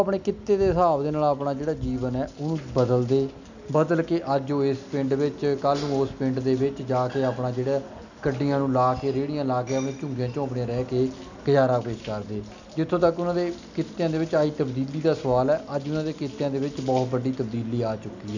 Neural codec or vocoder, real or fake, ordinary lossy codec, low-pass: none; real; none; 7.2 kHz